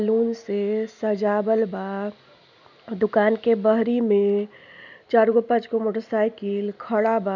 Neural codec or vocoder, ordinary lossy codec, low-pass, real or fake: none; none; 7.2 kHz; real